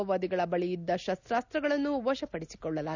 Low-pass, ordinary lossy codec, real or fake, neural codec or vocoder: 7.2 kHz; none; real; none